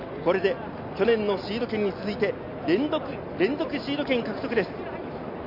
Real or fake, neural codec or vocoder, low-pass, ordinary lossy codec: real; none; 5.4 kHz; none